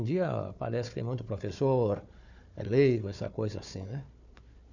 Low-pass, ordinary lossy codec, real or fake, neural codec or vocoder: 7.2 kHz; none; fake; codec, 16 kHz, 4 kbps, FunCodec, trained on Chinese and English, 50 frames a second